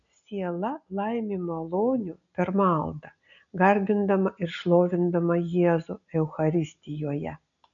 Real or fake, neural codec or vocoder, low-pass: real; none; 7.2 kHz